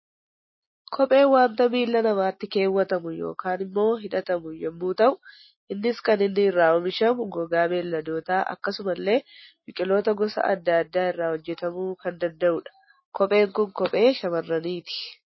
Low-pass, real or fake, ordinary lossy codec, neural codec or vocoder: 7.2 kHz; real; MP3, 24 kbps; none